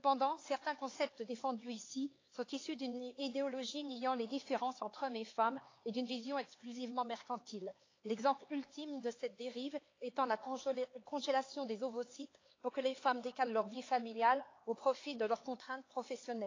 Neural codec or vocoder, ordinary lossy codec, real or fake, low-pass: codec, 16 kHz, 4 kbps, X-Codec, HuBERT features, trained on LibriSpeech; AAC, 32 kbps; fake; 7.2 kHz